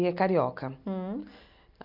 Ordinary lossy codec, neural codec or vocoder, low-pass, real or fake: none; none; 5.4 kHz; real